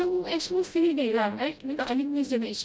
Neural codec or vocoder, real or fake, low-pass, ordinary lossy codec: codec, 16 kHz, 0.5 kbps, FreqCodec, smaller model; fake; none; none